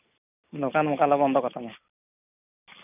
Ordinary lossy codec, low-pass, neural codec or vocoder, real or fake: none; 3.6 kHz; none; real